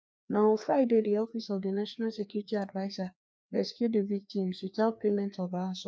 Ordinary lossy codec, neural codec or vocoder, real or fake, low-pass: none; codec, 16 kHz, 2 kbps, FreqCodec, larger model; fake; none